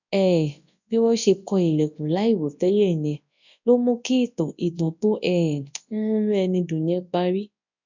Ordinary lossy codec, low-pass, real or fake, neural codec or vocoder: none; 7.2 kHz; fake; codec, 24 kHz, 0.9 kbps, WavTokenizer, large speech release